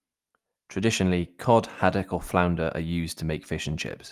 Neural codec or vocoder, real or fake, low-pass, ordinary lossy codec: none; real; 14.4 kHz; Opus, 32 kbps